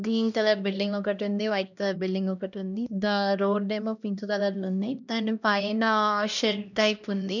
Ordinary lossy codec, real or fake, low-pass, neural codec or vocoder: none; fake; 7.2 kHz; codec, 16 kHz, 1 kbps, X-Codec, HuBERT features, trained on LibriSpeech